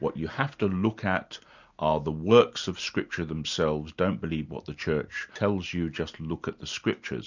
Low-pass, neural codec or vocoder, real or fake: 7.2 kHz; none; real